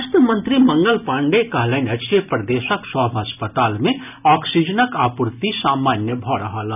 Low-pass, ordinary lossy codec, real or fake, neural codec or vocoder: 3.6 kHz; none; real; none